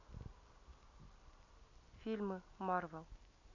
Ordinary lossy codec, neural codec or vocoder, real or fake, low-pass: MP3, 48 kbps; none; real; 7.2 kHz